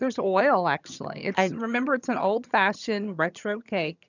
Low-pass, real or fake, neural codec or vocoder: 7.2 kHz; fake; vocoder, 22.05 kHz, 80 mel bands, HiFi-GAN